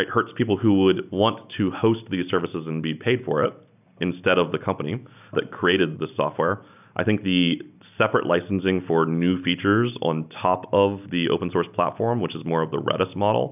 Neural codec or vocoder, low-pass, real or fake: none; 3.6 kHz; real